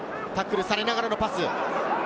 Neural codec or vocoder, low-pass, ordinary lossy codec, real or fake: none; none; none; real